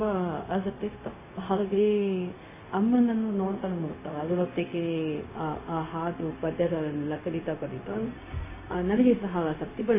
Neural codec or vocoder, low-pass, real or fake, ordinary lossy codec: codec, 16 kHz, 0.4 kbps, LongCat-Audio-Codec; 3.6 kHz; fake; MP3, 16 kbps